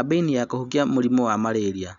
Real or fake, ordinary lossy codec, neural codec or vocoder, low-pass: real; none; none; 7.2 kHz